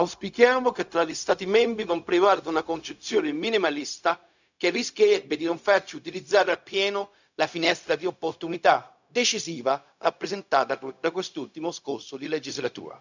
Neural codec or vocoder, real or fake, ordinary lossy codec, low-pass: codec, 16 kHz, 0.4 kbps, LongCat-Audio-Codec; fake; none; 7.2 kHz